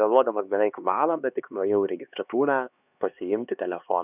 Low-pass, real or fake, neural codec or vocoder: 3.6 kHz; fake; codec, 16 kHz, 2 kbps, X-Codec, HuBERT features, trained on LibriSpeech